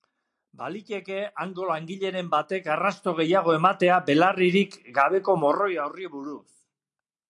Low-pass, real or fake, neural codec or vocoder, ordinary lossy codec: 10.8 kHz; real; none; AAC, 64 kbps